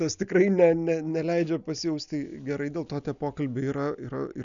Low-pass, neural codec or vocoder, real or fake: 7.2 kHz; none; real